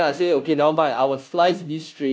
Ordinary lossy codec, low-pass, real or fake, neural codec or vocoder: none; none; fake; codec, 16 kHz, 0.5 kbps, FunCodec, trained on Chinese and English, 25 frames a second